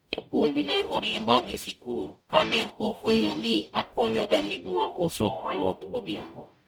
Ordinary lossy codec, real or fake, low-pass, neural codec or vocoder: none; fake; none; codec, 44.1 kHz, 0.9 kbps, DAC